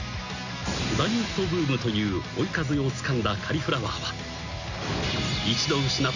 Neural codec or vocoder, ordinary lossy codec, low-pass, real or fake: none; Opus, 64 kbps; 7.2 kHz; real